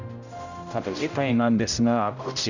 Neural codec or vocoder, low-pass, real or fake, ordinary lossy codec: codec, 16 kHz, 0.5 kbps, X-Codec, HuBERT features, trained on general audio; 7.2 kHz; fake; none